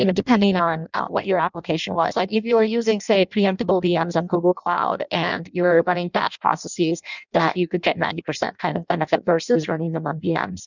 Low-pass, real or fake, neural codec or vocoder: 7.2 kHz; fake; codec, 16 kHz in and 24 kHz out, 0.6 kbps, FireRedTTS-2 codec